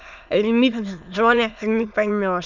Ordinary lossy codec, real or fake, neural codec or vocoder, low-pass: none; fake; autoencoder, 22.05 kHz, a latent of 192 numbers a frame, VITS, trained on many speakers; 7.2 kHz